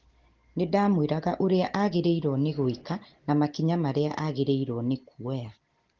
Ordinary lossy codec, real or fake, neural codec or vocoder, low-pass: Opus, 16 kbps; real; none; 7.2 kHz